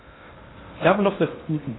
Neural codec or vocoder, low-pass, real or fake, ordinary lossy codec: codec, 16 kHz in and 24 kHz out, 0.6 kbps, FocalCodec, streaming, 4096 codes; 7.2 kHz; fake; AAC, 16 kbps